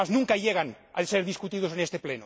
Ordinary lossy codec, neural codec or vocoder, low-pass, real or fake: none; none; none; real